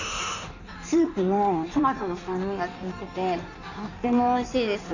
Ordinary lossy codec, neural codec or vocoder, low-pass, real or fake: none; codec, 16 kHz in and 24 kHz out, 1.1 kbps, FireRedTTS-2 codec; 7.2 kHz; fake